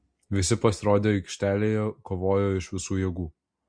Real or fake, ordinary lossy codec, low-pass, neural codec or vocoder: real; MP3, 48 kbps; 9.9 kHz; none